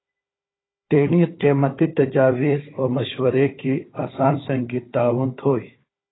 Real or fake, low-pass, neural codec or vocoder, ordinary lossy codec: fake; 7.2 kHz; codec, 16 kHz, 4 kbps, FunCodec, trained on Chinese and English, 50 frames a second; AAC, 16 kbps